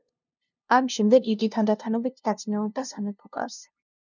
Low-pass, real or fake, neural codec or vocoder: 7.2 kHz; fake; codec, 16 kHz, 0.5 kbps, FunCodec, trained on LibriTTS, 25 frames a second